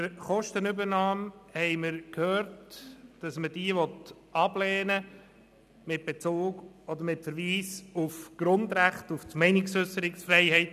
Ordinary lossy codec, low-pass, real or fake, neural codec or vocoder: none; 14.4 kHz; real; none